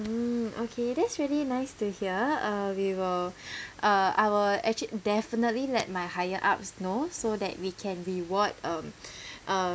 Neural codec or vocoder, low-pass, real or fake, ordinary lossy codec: none; none; real; none